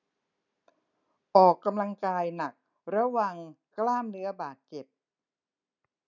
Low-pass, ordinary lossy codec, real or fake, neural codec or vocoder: 7.2 kHz; none; real; none